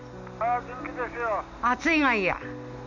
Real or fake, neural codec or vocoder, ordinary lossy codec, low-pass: real; none; none; 7.2 kHz